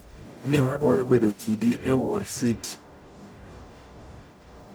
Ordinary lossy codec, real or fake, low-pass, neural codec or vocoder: none; fake; none; codec, 44.1 kHz, 0.9 kbps, DAC